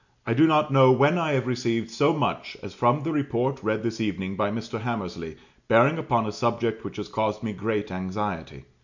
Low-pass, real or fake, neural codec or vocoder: 7.2 kHz; real; none